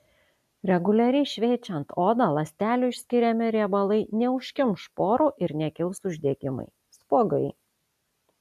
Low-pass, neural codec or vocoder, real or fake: 14.4 kHz; none; real